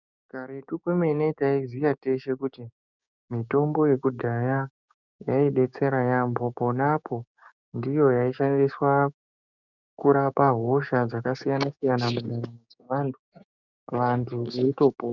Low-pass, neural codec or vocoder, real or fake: 7.2 kHz; codec, 16 kHz, 6 kbps, DAC; fake